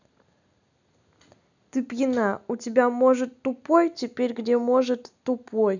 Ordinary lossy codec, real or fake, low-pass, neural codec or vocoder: none; real; 7.2 kHz; none